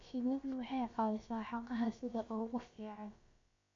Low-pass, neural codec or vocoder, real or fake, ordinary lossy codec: 7.2 kHz; codec, 16 kHz, about 1 kbps, DyCAST, with the encoder's durations; fake; MP3, 48 kbps